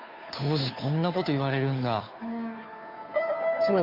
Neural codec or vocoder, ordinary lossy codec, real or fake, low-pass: codec, 16 kHz, 2 kbps, FunCodec, trained on Chinese and English, 25 frames a second; MP3, 48 kbps; fake; 5.4 kHz